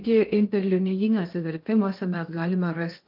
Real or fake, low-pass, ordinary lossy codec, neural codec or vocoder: fake; 5.4 kHz; Opus, 32 kbps; codec, 16 kHz in and 24 kHz out, 0.6 kbps, FocalCodec, streaming, 2048 codes